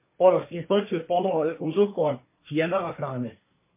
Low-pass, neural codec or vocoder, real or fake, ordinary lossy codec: 3.6 kHz; codec, 24 kHz, 1 kbps, SNAC; fake; MP3, 24 kbps